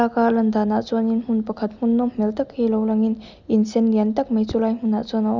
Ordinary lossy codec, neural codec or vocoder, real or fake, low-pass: none; none; real; 7.2 kHz